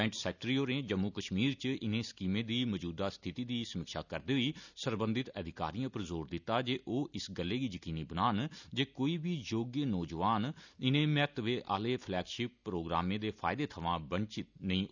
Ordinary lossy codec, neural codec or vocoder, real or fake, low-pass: none; none; real; 7.2 kHz